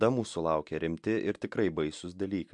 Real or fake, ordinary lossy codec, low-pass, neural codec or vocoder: real; MP3, 64 kbps; 10.8 kHz; none